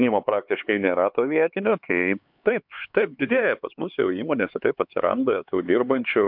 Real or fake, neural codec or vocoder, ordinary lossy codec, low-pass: fake; codec, 16 kHz, 4 kbps, X-Codec, HuBERT features, trained on LibriSpeech; MP3, 48 kbps; 5.4 kHz